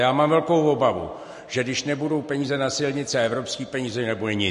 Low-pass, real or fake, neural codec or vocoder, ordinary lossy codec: 14.4 kHz; real; none; MP3, 48 kbps